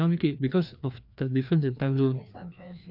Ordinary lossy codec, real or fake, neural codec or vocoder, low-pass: none; fake; codec, 16 kHz, 2 kbps, FreqCodec, larger model; 5.4 kHz